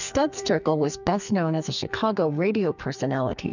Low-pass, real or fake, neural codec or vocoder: 7.2 kHz; fake; codec, 44.1 kHz, 2.6 kbps, SNAC